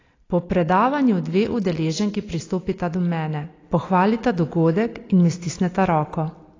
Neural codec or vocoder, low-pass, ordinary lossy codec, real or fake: none; 7.2 kHz; AAC, 32 kbps; real